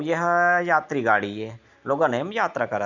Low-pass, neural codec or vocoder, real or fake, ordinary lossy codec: 7.2 kHz; none; real; none